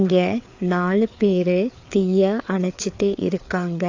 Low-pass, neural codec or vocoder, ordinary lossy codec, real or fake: 7.2 kHz; codec, 16 kHz, 4 kbps, FreqCodec, larger model; AAC, 48 kbps; fake